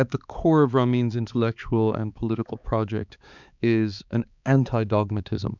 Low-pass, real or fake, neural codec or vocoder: 7.2 kHz; fake; codec, 16 kHz, 4 kbps, X-Codec, HuBERT features, trained on balanced general audio